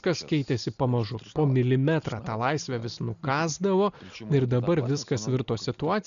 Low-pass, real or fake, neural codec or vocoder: 7.2 kHz; real; none